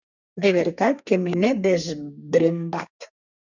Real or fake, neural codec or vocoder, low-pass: fake; codec, 32 kHz, 1.9 kbps, SNAC; 7.2 kHz